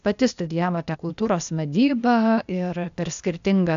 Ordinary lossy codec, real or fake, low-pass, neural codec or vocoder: AAC, 96 kbps; fake; 7.2 kHz; codec, 16 kHz, 0.8 kbps, ZipCodec